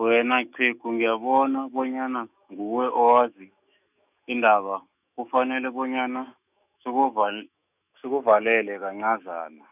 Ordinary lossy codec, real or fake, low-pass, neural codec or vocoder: none; fake; 3.6 kHz; autoencoder, 48 kHz, 128 numbers a frame, DAC-VAE, trained on Japanese speech